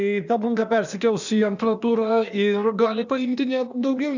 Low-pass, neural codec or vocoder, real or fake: 7.2 kHz; codec, 16 kHz, 0.8 kbps, ZipCodec; fake